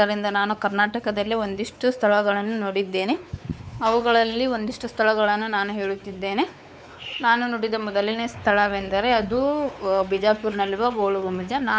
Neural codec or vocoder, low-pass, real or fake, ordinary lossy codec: codec, 16 kHz, 4 kbps, X-Codec, WavLM features, trained on Multilingual LibriSpeech; none; fake; none